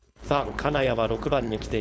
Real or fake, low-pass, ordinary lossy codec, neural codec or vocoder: fake; none; none; codec, 16 kHz, 4.8 kbps, FACodec